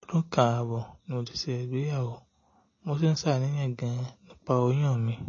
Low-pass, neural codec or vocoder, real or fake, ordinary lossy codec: 7.2 kHz; none; real; MP3, 32 kbps